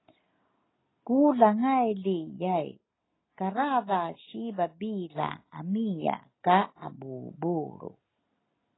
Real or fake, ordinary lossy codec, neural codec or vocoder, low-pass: real; AAC, 16 kbps; none; 7.2 kHz